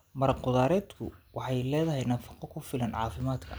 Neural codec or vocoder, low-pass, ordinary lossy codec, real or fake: none; none; none; real